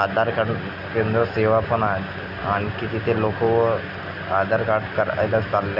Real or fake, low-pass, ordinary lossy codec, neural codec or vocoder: real; 5.4 kHz; none; none